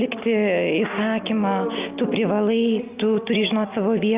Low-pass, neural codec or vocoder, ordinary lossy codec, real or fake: 3.6 kHz; none; Opus, 24 kbps; real